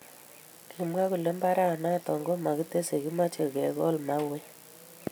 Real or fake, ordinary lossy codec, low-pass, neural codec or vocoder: real; none; none; none